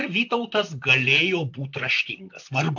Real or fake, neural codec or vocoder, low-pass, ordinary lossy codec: fake; vocoder, 44.1 kHz, 128 mel bands, Pupu-Vocoder; 7.2 kHz; AAC, 48 kbps